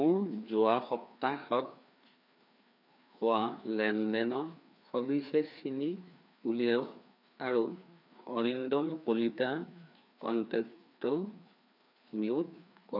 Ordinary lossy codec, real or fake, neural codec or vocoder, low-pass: none; fake; codec, 16 kHz, 2 kbps, FreqCodec, larger model; 5.4 kHz